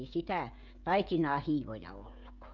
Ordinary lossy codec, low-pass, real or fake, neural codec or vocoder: none; 7.2 kHz; fake; codec, 16 kHz, 16 kbps, FreqCodec, smaller model